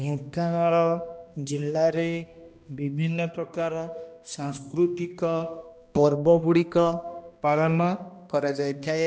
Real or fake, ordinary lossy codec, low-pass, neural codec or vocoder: fake; none; none; codec, 16 kHz, 1 kbps, X-Codec, HuBERT features, trained on balanced general audio